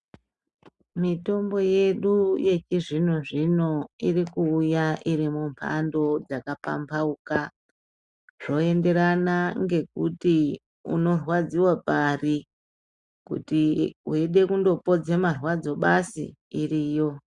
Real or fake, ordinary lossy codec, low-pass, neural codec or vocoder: real; Opus, 64 kbps; 10.8 kHz; none